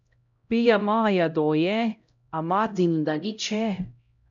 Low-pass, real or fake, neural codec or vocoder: 7.2 kHz; fake; codec, 16 kHz, 0.5 kbps, X-Codec, HuBERT features, trained on LibriSpeech